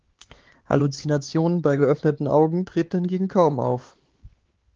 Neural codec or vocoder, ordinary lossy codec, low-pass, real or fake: codec, 16 kHz, 4 kbps, X-Codec, HuBERT features, trained on LibriSpeech; Opus, 16 kbps; 7.2 kHz; fake